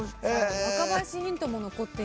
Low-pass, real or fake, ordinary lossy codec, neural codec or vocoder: none; real; none; none